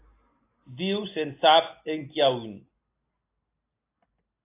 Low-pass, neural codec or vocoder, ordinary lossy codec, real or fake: 3.6 kHz; none; AAC, 24 kbps; real